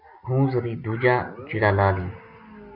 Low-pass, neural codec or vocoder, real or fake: 5.4 kHz; none; real